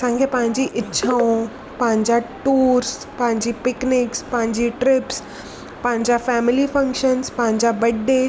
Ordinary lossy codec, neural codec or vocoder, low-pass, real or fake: none; none; none; real